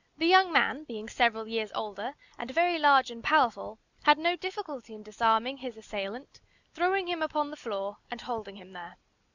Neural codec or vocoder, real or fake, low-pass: none; real; 7.2 kHz